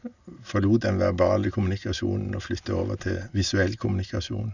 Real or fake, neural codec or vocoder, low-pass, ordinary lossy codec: real; none; 7.2 kHz; none